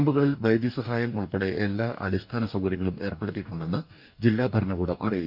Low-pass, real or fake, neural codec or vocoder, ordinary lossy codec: 5.4 kHz; fake; codec, 44.1 kHz, 2.6 kbps, DAC; none